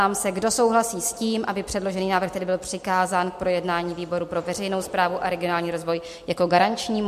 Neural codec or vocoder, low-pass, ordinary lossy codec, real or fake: none; 14.4 kHz; MP3, 64 kbps; real